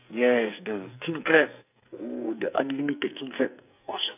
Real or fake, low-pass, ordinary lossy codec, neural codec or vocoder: fake; 3.6 kHz; none; codec, 44.1 kHz, 2.6 kbps, SNAC